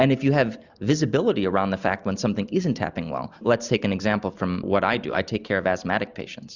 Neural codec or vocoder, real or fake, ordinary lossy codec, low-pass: none; real; Opus, 64 kbps; 7.2 kHz